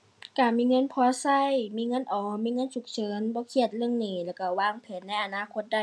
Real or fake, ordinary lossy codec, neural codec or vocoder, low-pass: real; none; none; none